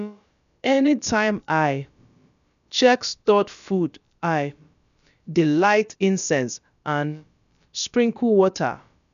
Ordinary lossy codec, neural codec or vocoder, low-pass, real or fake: none; codec, 16 kHz, about 1 kbps, DyCAST, with the encoder's durations; 7.2 kHz; fake